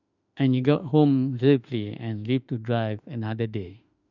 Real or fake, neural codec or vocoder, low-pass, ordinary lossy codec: fake; autoencoder, 48 kHz, 32 numbers a frame, DAC-VAE, trained on Japanese speech; 7.2 kHz; none